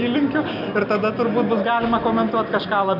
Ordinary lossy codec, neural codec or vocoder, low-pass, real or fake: AAC, 48 kbps; none; 5.4 kHz; real